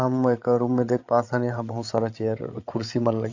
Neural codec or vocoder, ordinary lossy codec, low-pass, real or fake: codec, 16 kHz, 8 kbps, FreqCodec, larger model; AAC, 48 kbps; 7.2 kHz; fake